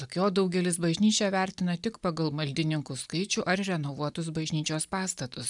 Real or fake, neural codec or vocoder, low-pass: real; none; 10.8 kHz